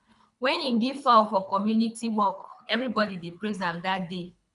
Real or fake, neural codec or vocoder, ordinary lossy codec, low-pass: fake; codec, 24 kHz, 3 kbps, HILCodec; none; 10.8 kHz